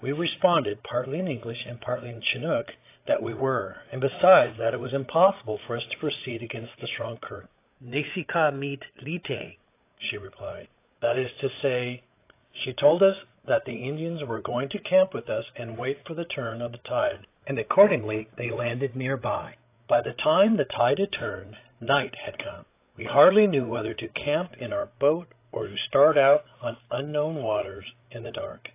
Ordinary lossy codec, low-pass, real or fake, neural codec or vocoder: AAC, 24 kbps; 3.6 kHz; fake; codec, 16 kHz, 8 kbps, FreqCodec, larger model